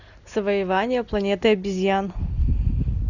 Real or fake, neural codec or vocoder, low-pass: real; none; 7.2 kHz